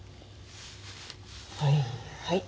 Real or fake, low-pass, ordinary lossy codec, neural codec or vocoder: real; none; none; none